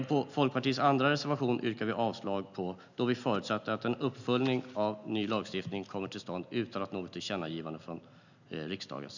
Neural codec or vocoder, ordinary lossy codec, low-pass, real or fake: none; none; 7.2 kHz; real